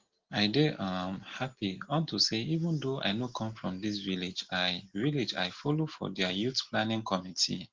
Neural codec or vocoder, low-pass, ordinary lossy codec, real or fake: none; 7.2 kHz; Opus, 16 kbps; real